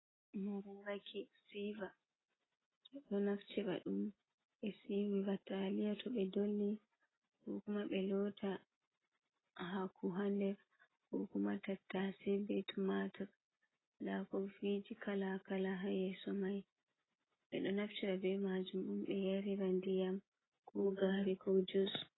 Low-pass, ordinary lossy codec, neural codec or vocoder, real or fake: 7.2 kHz; AAC, 16 kbps; none; real